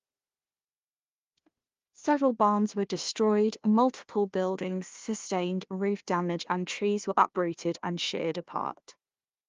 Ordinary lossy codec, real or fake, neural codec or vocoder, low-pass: Opus, 32 kbps; fake; codec, 16 kHz, 1 kbps, FunCodec, trained on Chinese and English, 50 frames a second; 7.2 kHz